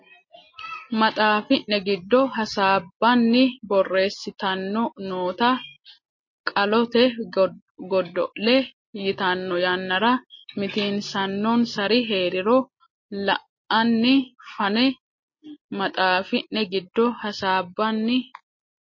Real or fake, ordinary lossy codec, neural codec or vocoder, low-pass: real; MP3, 32 kbps; none; 7.2 kHz